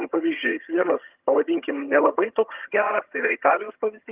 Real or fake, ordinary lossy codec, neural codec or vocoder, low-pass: fake; Opus, 32 kbps; vocoder, 22.05 kHz, 80 mel bands, HiFi-GAN; 3.6 kHz